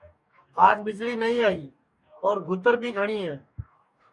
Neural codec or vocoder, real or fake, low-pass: codec, 44.1 kHz, 2.6 kbps, DAC; fake; 10.8 kHz